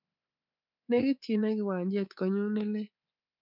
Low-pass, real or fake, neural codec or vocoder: 5.4 kHz; fake; codec, 24 kHz, 3.1 kbps, DualCodec